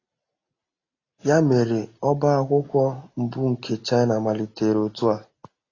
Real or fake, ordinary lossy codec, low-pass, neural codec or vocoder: real; AAC, 32 kbps; 7.2 kHz; none